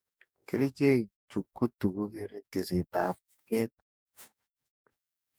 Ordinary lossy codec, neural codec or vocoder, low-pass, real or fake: none; codec, 44.1 kHz, 2.6 kbps, DAC; none; fake